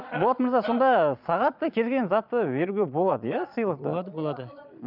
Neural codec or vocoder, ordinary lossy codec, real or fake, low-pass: vocoder, 22.05 kHz, 80 mel bands, Vocos; none; fake; 5.4 kHz